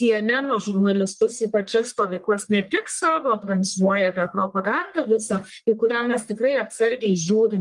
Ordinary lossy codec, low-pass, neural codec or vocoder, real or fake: Opus, 32 kbps; 10.8 kHz; codec, 44.1 kHz, 1.7 kbps, Pupu-Codec; fake